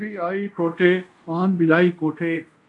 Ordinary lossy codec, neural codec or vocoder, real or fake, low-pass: AAC, 64 kbps; codec, 24 kHz, 0.5 kbps, DualCodec; fake; 10.8 kHz